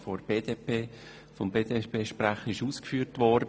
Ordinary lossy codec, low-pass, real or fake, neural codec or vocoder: none; none; real; none